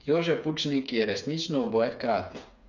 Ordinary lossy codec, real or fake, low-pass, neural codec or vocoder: none; fake; 7.2 kHz; codec, 16 kHz, 4 kbps, FreqCodec, smaller model